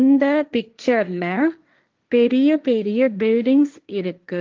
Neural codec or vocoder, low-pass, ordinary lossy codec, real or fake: codec, 16 kHz, 1.1 kbps, Voila-Tokenizer; 7.2 kHz; Opus, 24 kbps; fake